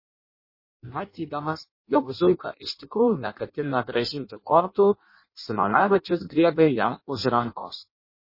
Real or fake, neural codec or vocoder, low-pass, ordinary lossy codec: fake; codec, 16 kHz in and 24 kHz out, 0.6 kbps, FireRedTTS-2 codec; 5.4 kHz; MP3, 24 kbps